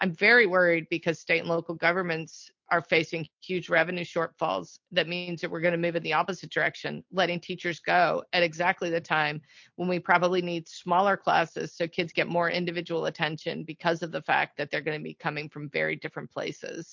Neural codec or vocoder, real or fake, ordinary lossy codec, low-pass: none; real; MP3, 48 kbps; 7.2 kHz